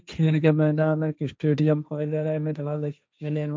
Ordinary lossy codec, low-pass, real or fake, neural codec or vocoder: none; none; fake; codec, 16 kHz, 1.1 kbps, Voila-Tokenizer